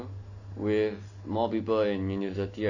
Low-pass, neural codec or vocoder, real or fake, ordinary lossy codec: 7.2 kHz; none; real; none